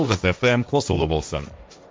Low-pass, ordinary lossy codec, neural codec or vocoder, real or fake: none; none; codec, 16 kHz, 1.1 kbps, Voila-Tokenizer; fake